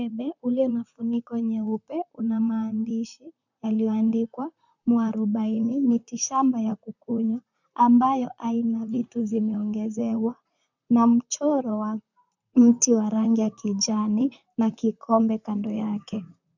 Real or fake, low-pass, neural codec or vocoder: fake; 7.2 kHz; vocoder, 44.1 kHz, 128 mel bands, Pupu-Vocoder